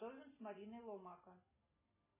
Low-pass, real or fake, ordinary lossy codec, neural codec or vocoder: 3.6 kHz; real; MP3, 16 kbps; none